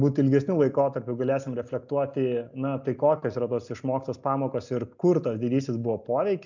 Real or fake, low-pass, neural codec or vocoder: real; 7.2 kHz; none